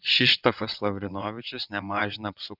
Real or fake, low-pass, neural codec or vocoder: fake; 5.4 kHz; vocoder, 22.05 kHz, 80 mel bands, WaveNeXt